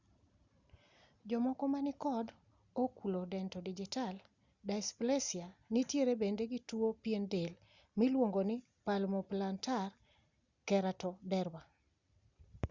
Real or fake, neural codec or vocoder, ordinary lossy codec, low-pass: real; none; Opus, 64 kbps; 7.2 kHz